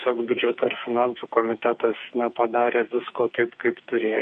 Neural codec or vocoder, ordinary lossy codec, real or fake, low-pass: codec, 44.1 kHz, 2.6 kbps, SNAC; MP3, 48 kbps; fake; 14.4 kHz